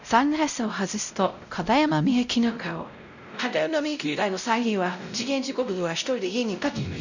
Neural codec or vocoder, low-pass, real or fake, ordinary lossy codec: codec, 16 kHz, 0.5 kbps, X-Codec, WavLM features, trained on Multilingual LibriSpeech; 7.2 kHz; fake; none